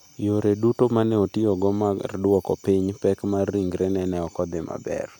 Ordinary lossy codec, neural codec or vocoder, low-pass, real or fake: none; none; 19.8 kHz; real